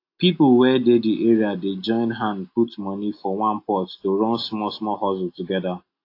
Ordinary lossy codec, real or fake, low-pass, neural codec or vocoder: AAC, 32 kbps; real; 5.4 kHz; none